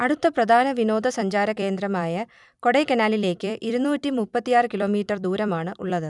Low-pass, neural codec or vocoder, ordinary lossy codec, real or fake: 10.8 kHz; vocoder, 44.1 kHz, 128 mel bands every 256 samples, BigVGAN v2; none; fake